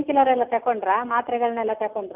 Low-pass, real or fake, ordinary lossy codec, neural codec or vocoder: 3.6 kHz; real; none; none